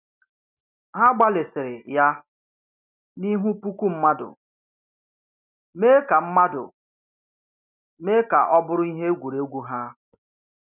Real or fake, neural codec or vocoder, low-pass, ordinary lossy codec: real; none; 3.6 kHz; none